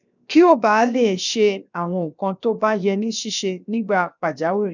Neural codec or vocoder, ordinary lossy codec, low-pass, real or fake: codec, 16 kHz, 0.7 kbps, FocalCodec; none; 7.2 kHz; fake